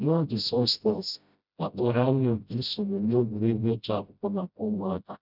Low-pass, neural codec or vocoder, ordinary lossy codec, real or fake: 5.4 kHz; codec, 16 kHz, 0.5 kbps, FreqCodec, smaller model; none; fake